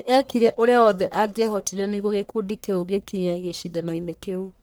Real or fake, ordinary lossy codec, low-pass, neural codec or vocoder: fake; none; none; codec, 44.1 kHz, 1.7 kbps, Pupu-Codec